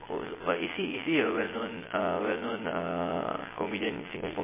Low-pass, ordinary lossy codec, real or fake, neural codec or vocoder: 3.6 kHz; MP3, 16 kbps; fake; vocoder, 22.05 kHz, 80 mel bands, Vocos